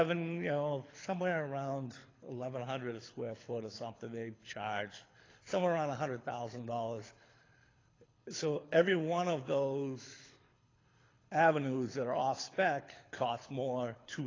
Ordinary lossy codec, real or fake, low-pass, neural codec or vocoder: AAC, 32 kbps; fake; 7.2 kHz; codec, 24 kHz, 6 kbps, HILCodec